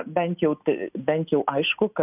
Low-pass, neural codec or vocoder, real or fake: 3.6 kHz; none; real